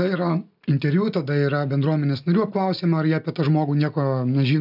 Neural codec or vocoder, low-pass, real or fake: none; 5.4 kHz; real